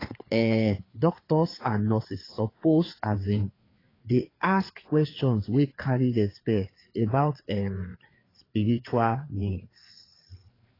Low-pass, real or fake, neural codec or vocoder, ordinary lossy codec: 5.4 kHz; fake; codec, 16 kHz, 4 kbps, FreqCodec, larger model; AAC, 24 kbps